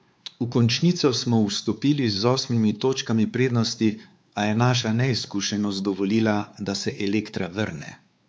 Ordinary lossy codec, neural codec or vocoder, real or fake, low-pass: none; codec, 16 kHz, 4 kbps, X-Codec, WavLM features, trained on Multilingual LibriSpeech; fake; none